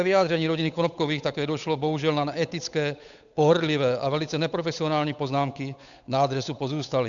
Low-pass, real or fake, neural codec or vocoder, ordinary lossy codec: 7.2 kHz; fake; codec, 16 kHz, 8 kbps, FunCodec, trained on Chinese and English, 25 frames a second; MP3, 96 kbps